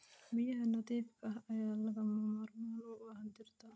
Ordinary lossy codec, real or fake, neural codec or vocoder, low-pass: none; real; none; none